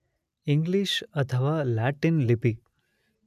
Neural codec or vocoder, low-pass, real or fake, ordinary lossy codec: none; 14.4 kHz; real; none